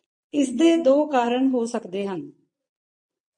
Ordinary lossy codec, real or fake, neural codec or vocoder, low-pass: MP3, 48 kbps; fake; vocoder, 22.05 kHz, 80 mel bands, Vocos; 9.9 kHz